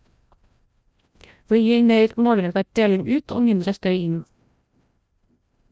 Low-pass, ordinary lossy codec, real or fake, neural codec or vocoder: none; none; fake; codec, 16 kHz, 0.5 kbps, FreqCodec, larger model